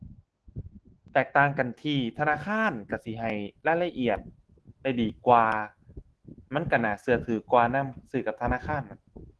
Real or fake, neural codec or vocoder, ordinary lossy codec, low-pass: fake; autoencoder, 48 kHz, 128 numbers a frame, DAC-VAE, trained on Japanese speech; Opus, 16 kbps; 10.8 kHz